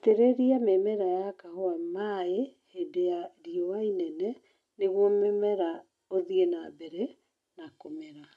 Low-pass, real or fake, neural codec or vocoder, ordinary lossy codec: none; real; none; none